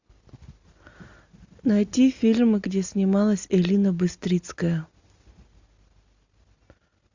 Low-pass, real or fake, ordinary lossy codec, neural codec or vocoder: 7.2 kHz; real; Opus, 64 kbps; none